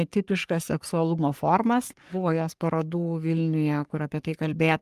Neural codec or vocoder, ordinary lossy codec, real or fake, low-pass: codec, 44.1 kHz, 3.4 kbps, Pupu-Codec; Opus, 32 kbps; fake; 14.4 kHz